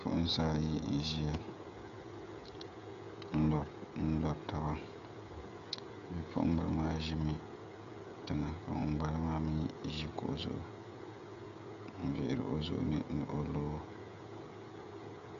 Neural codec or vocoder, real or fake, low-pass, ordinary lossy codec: codec, 16 kHz, 16 kbps, FreqCodec, smaller model; fake; 7.2 kHz; AAC, 96 kbps